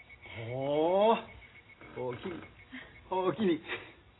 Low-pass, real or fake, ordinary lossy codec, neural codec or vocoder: 7.2 kHz; real; AAC, 16 kbps; none